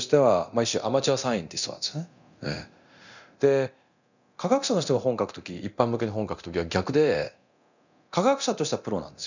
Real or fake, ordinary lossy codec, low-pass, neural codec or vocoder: fake; none; 7.2 kHz; codec, 24 kHz, 0.9 kbps, DualCodec